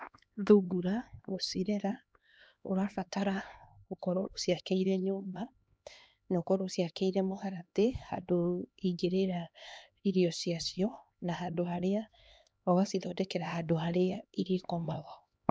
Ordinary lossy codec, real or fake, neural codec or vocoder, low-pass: none; fake; codec, 16 kHz, 2 kbps, X-Codec, HuBERT features, trained on LibriSpeech; none